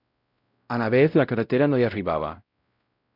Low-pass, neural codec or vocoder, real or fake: 5.4 kHz; codec, 16 kHz, 0.5 kbps, X-Codec, WavLM features, trained on Multilingual LibriSpeech; fake